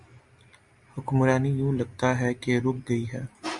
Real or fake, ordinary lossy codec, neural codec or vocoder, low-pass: real; Opus, 64 kbps; none; 10.8 kHz